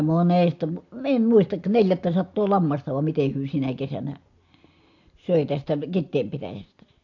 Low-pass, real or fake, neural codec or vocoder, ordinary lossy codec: 7.2 kHz; real; none; AAC, 48 kbps